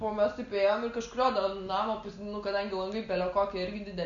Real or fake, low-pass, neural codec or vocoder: real; 7.2 kHz; none